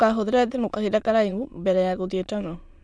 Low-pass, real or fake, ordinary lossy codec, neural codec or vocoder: none; fake; none; autoencoder, 22.05 kHz, a latent of 192 numbers a frame, VITS, trained on many speakers